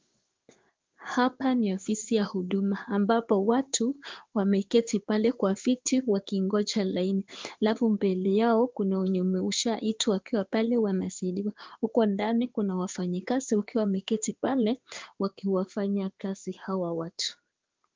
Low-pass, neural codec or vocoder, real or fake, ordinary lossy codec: 7.2 kHz; codec, 16 kHz in and 24 kHz out, 1 kbps, XY-Tokenizer; fake; Opus, 24 kbps